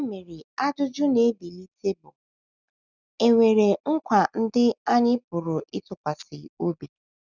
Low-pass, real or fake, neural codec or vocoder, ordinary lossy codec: 7.2 kHz; real; none; none